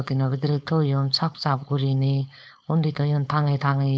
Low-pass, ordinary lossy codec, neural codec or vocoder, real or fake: none; none; codec, 16 kHz, 4.8 kbps, FACodec; fake